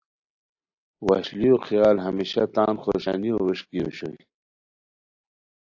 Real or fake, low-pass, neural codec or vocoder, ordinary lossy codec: real; 7.2 kHz; none; AAC, 48 kbps